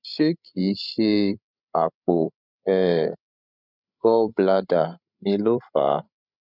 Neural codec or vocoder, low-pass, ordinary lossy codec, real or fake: codec, 16 kHz, 16 kbps, FreqCodec, larger model; 5.4 kHz; none; fake